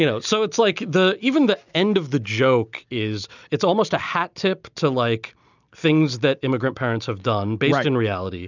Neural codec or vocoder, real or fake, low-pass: none; real; 7.2 kHz